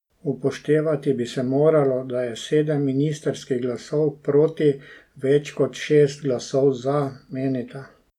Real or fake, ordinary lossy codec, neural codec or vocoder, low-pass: real; none; none; 19.8 kHz